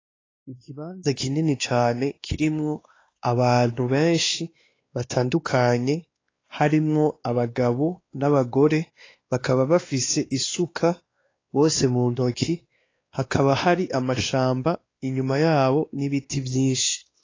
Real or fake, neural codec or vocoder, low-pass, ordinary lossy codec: fake; codec, 16 kHz, 2 kbps, X-Codec, WavLM features, trained on Multilingual LibriSpeech; 7.2 kHz; AAC, 32 kbps